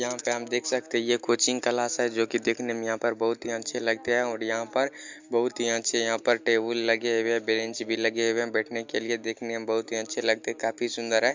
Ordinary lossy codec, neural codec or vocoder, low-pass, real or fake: MP3, 64 kbps; none; 7.2 kHz; real